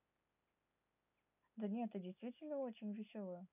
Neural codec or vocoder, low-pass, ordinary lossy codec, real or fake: none; 3.6 kHz; none; real